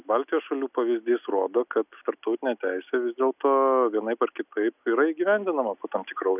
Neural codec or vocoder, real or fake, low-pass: none; real; 3.6 kHz